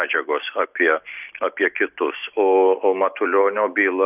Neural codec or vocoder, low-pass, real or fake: none; 3.6 kHz; real